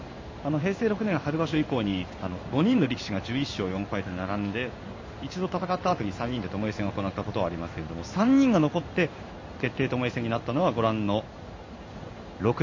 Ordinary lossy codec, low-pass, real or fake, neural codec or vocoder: MP3, 32 kbps; 7.2 kHz; fake; codec, 16 kHz in and 24 kHz out, 1 kbps, XY-Tokenizer